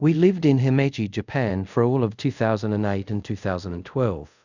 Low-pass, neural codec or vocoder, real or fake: 7.2 kHz; codec, 24 kHz, 0.5 kbps, DualCodec; fake